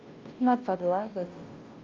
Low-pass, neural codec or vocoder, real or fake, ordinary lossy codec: 7.2 kHz; codec, 16 kHz, 0.5 kbps, FunCodec, trained on Chinese and English, 25 frames a second; fake; Opus, 24 kbps